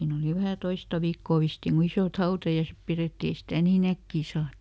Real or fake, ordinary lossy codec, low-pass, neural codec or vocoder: real; none; none; none